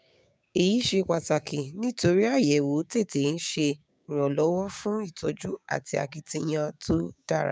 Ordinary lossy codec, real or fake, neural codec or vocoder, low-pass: none; fake; codec, 16 kHz, 6 kbps, DAC; none